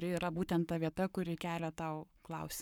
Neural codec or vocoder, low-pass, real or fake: codec, 44.1 kHz, 7.8 kbps, Pupu-Codec; 19.8 kHz; fake